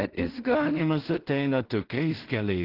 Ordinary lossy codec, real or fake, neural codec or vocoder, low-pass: Opus, 16 kbps; fake; codec, 16 kHz in and 24 kHz out, 0.4 kbps, LongCat-Audio-Codec, two codebook decoder; 5.4 kHz